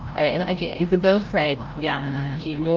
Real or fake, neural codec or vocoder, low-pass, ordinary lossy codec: fake; codec, 16 kHz, 0.5 kbps, FreqCodec, larger model; 7.2 kHz; Opus, 24 kbps